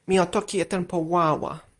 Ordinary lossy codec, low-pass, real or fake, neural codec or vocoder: Opus, 64 kbps; 10.8 kHz; real; none